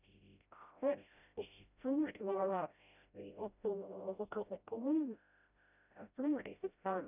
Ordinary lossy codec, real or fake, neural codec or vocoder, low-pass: none; fake; codec, 16 kHz, 0.5 kbps, FreqCodec, smaller model; 3.6 kHz